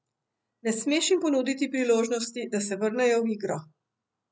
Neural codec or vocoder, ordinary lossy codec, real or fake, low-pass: none; none; real; none